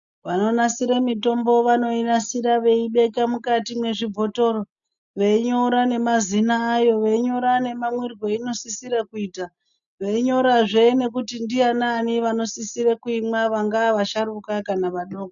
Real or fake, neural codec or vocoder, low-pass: real; none; 7.2 kHz